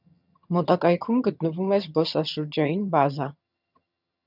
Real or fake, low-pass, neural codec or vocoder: fake; 5.4 kHz; vocoder, 22.05 kHz, 80 mel bands, HiFi-GAN